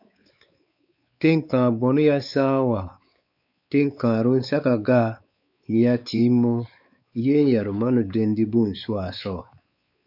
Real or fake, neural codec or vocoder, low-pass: fake; codec, 16 kHz, 4 kbps, X-Codec, WavLM features, trained on Multilingual LibriSpeech; 5.4 kHz